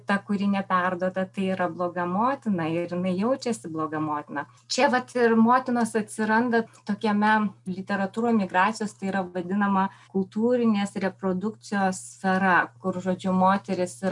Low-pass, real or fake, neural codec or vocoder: 10.8 kHz; real; none